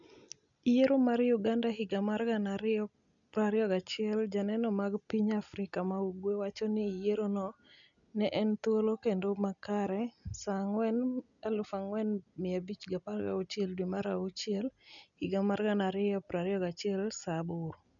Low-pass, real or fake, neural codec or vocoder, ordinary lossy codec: 7.2 kHz; real; none; none